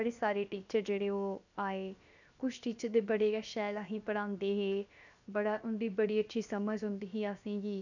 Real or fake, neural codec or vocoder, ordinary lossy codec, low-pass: fake; codec, 16 kHz, 0.7 kbps, FocalCodec; none; 7.2 kHz